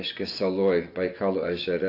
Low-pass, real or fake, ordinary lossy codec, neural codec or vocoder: 5.4 kHz; fake; AAC, 32 kbps; vocoder, 24 kHz, 100 mel bands, Vocos